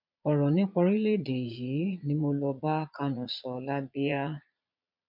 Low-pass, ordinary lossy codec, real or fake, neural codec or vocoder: 5.4 kHz; MP3, 48 kbps; fake; vocoder, 22.05 kHz, 80 mel bands, Vocos